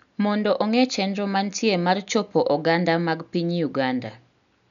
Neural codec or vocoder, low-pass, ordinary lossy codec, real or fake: none; 7.2 kHz; none; real